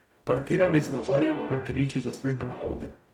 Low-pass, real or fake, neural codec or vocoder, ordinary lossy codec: 19.8 kHz; fake; codec, 44.1 kHz, 0.9 kbps, DAC; none